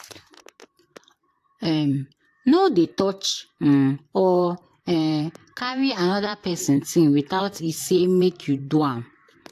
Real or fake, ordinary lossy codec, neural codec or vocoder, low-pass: fake; AAC, 64 kbps; vocoder, 44.1 kHz, 128 mel bands, Pupu-Vocoder; 14.4 kHz